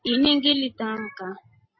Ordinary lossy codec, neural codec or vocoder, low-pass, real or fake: MP3, 24 kbps; none; 7.2 kHz; real